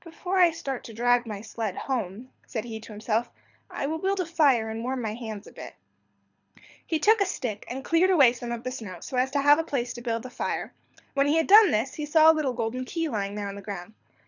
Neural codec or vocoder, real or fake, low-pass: codec, 24 kHz, 6 kbps, HILCodec; fake; 7.2 kHz